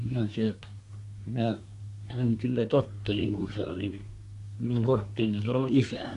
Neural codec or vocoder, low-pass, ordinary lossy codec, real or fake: codec, 24 kHz, 1 kbps, SNAC; 10.8 kHz; none; fake